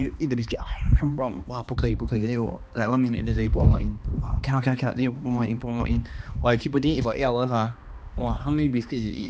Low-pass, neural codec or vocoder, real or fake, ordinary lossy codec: none; codec, 16 kHz, 2 kbps, X-Codec, HuBERT features, trained on balanced general audio; fake; none